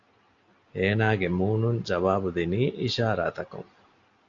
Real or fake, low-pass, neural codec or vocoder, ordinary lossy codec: real; 7.2 kHz; none; AAC, 48 kbps